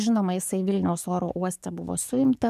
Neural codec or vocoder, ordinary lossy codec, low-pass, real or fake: codec, 44.1 kHz, 7.8 kbps, DAC; MP3, 96 kbps; 14.4 kHz; fake